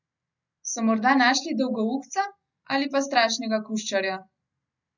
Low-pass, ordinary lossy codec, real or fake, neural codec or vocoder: 7.2 kHz; none; real; none